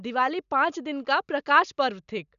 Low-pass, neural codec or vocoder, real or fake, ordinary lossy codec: 7.2 kHz; none; real; none